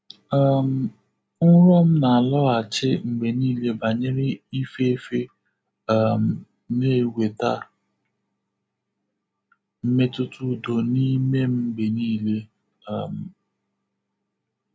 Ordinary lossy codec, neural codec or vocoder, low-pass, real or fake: none; none; none; real